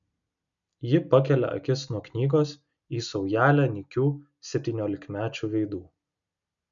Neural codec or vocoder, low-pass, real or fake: none; 7.2 kHz; real